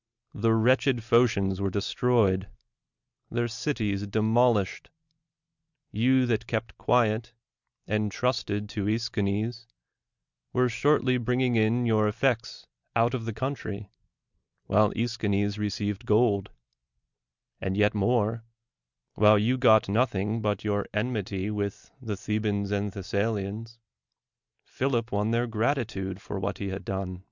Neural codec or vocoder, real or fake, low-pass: none; real; 7.2 kHz